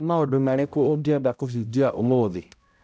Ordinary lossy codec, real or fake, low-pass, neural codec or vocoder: none; fake; none; codec, 16 kHz, 0.5 kbps, X-Codec, HuBERT features, trained on balanced general audio